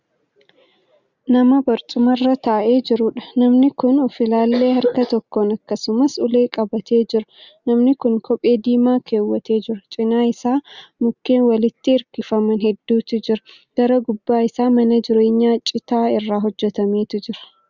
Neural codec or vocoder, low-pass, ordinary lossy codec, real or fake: none; 7.2 kHz; Opus, 64 kbps; real